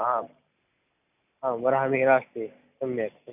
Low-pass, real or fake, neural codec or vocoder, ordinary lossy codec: 3.6 kHz; real; none; none